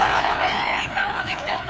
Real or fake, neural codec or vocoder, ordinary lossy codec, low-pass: fake; codec, 16 kHz, 2 kbps, FreqCodec, larger model; none; none